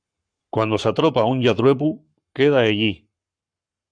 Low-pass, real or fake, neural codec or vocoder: 9.9 kHz; fake; codec, 44.1 kHz, 7.8 kbps, Pupu-Codec